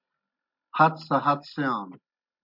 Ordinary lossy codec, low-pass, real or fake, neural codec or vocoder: MP3, 48 kbps; 5.4 kHz; real; none